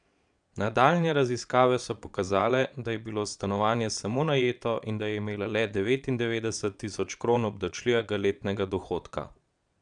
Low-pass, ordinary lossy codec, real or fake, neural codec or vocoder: 9.9 kHz; none; fake; vocoder, 22.05 kHz, 80 mel bands, Vocos